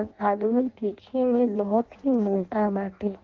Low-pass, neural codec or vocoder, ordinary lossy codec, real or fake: 7.2 kHz; codec, 16 kHz in and 24 kHz out, 0.6 kbps, FireRedTTS-2 codec; Opus, 16 kbps; fake